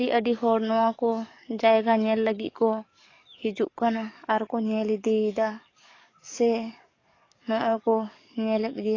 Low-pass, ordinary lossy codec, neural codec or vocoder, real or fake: 7.2 kHz; AAC, 32 kbps; codec, 44.1 kHz, 7.8 kbps, DAC; fake